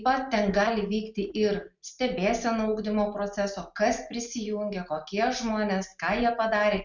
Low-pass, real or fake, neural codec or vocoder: 7.2 kHz; real; none